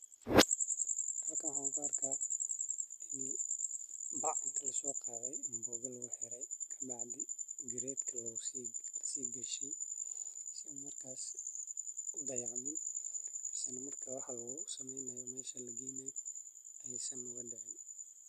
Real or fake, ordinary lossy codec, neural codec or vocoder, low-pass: real; none; none; 14.4 kHz